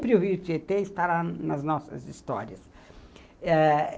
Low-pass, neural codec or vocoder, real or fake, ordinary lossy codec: none; none; real; none